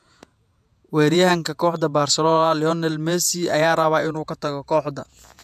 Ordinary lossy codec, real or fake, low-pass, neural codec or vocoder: MP3, 96 kbps; fake; 14.4 kHz; vocoder, 48 kHz, 128 mel bands, Vocos